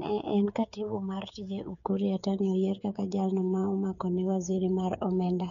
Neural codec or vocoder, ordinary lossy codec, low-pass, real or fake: codec, 16 kHz, 8 kbps, FreqCodec, smaller model; none; 7.2 kHz; fake